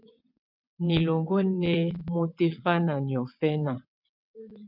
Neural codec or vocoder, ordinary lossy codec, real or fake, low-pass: vocoder, 22.05 kHz, 80 mel bands, WaveNeXt; MP3, 48 kbps; fake; 5.4 kHz